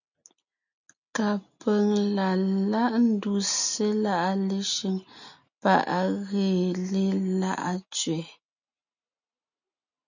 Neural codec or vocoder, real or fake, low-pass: none; real; 7.2 kHz